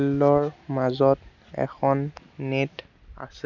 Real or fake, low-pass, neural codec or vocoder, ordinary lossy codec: real; 7.2 kHz; none; none